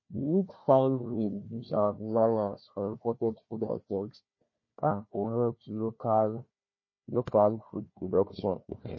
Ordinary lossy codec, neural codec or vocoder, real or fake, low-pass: MP3, 32 kbps; codec, 16 kHz, 1 kbps, FunCodec, trained on Chinese and English, 50 frames a second; fake; 7.2 kHz